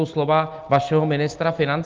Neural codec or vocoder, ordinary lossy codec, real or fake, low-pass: none; Opus, 32 kbps; real; 7.2 kHz